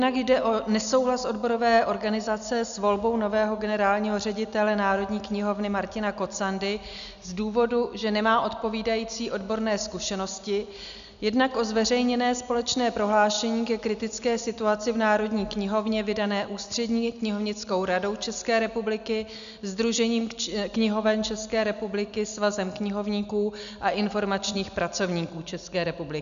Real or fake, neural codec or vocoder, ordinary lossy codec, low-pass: real; none; MP3, 96 kbps; 7.2 kHz